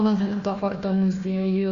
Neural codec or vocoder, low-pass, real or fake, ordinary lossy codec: codec, 16 kHz, 1 kbps, FunCodec, trained on Chinese and English, 50 frames a second; 7.2 kHz; fake; Opus, 64 kbps